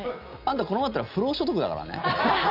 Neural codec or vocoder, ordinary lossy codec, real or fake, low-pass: none; none; real; 5.4 kHz